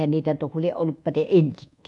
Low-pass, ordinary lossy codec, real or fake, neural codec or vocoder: 10.8 kHz; none; fake; codec, 24 kHz, 1.2 kbps, DualCodec